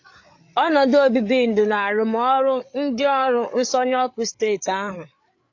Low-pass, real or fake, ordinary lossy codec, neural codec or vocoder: 7.2 kHz; fake; AAC, 48 kbps; codec, 16 kHz, 8 kbps, FreqCodec, larger model